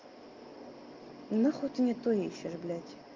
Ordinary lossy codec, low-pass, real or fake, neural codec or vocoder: Opus, 32 kbps; 7.2 kHz; real; none